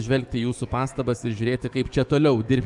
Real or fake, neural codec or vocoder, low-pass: fake; codec, 44.1 kHz, 7.8 kbps, DAC; 10.8 kHz